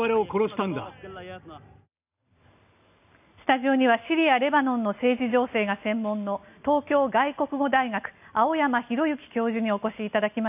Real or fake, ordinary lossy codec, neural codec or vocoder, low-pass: real; none; none; 3.6 kHz